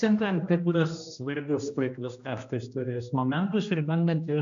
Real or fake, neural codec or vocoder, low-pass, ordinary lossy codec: fake; codec, 16 kHz, 1 kbps, X-Codec, HuBERT features, trained on general audio; 7.2 kHz; MP3, 64 kbps